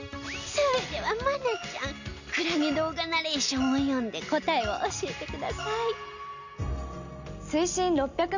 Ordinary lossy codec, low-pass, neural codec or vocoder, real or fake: none; 7.2 kHz; none; real